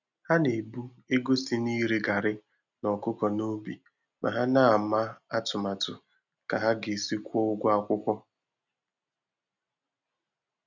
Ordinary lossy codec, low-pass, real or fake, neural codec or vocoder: none; 7.2 kHz; real; none